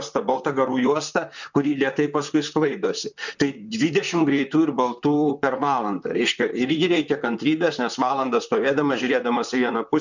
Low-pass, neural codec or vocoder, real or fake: 7.2 kHz; vocoder, 44.1 kHz, 128 mel bands, Pupu-Vocoder; fake